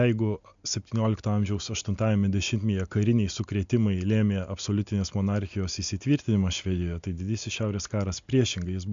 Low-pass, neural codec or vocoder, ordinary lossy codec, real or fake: 7.2 kHz; none; MP3, 64 kbps; real